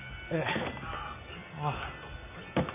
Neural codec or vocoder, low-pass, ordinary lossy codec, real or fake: none; 3.6 kHz; none; real